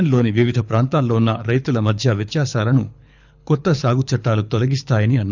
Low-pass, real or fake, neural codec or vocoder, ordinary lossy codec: 7.2 kHz; fake; codec, 24 kHz, 6 kbps, HILCodec; none